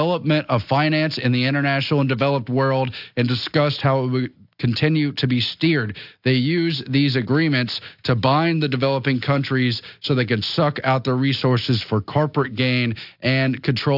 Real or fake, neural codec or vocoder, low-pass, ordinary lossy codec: real; none; 5.4 kHz; AAC, 48 kbps